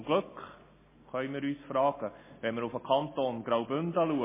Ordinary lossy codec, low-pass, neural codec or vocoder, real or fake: MP3, 16 kbps; 3.6 kHz; none; real